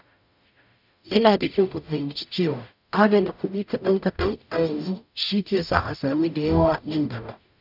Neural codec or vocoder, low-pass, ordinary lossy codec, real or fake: codec, 44.1 kHz, 0.9 kbps, DAC; 5.4 kHz; none; fake